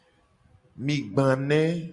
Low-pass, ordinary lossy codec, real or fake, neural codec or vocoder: 10.8 kHz; Opus, 64 kbps; real; none